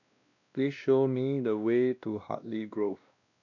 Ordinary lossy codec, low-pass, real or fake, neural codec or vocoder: none; 7.2 kHz; fake; codec, 16 kHz, 1 kbps, X-Codec, WavLM features, trained on Multilingual LibriSpeech